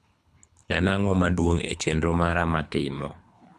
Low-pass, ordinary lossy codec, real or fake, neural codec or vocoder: none; none; fake; codec, 24 kHz, 3 kbps, HILCodec